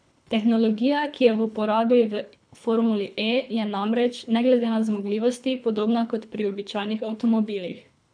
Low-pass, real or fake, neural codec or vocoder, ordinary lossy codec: 9.9 kHz; fake; codec, 24 kHz, 3 kbps, HILCodec; none